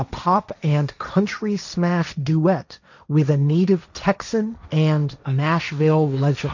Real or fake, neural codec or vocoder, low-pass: fake; codec, 16 kHz, 1.1 kbps, Voila-Tokenizer; 7.2 kHz